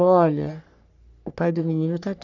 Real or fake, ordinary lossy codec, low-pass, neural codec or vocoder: fake; none; 7.2 kHz; codec, 44.1 kHz, 3.4 kbps, Pupu-Codec